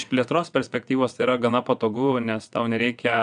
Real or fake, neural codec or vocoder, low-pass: fake; vocoder, 22.05 kHz, 80 mel bands, WaveNeXt; 9.9 kHz